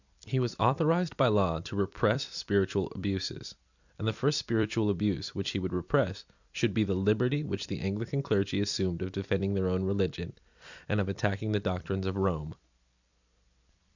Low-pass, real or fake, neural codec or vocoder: 7.2 kHz; fake; vocoder, 44.1 kHz, 128 mel bands every 256 samples, BigVGAN v2